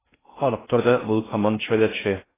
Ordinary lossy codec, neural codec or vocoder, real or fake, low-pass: AAC, 16 kbps; codec, 16 kHz in and 24 kHz out, 0.6 kbps, FocalCodec, streaming, 2048 codes; fake; 3.6 kHz